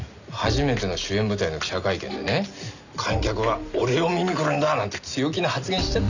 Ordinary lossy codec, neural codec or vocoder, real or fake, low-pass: none; none; real; 7.2 kHz